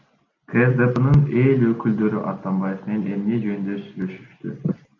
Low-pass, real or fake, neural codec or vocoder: 7.2 kHz; real; none